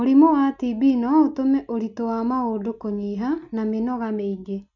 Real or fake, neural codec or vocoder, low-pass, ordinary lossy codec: real; none; 7.2 kHz; none